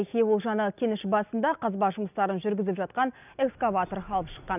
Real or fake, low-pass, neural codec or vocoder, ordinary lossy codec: real; 3.6 kHz; none; none